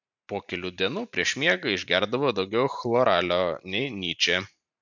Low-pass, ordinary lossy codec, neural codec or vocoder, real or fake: 7.2 kHz; MP3, 64 kbps; vocoder, 44.1 kHz, 128 mel bands every 512 samples, BigVGAN v2; fake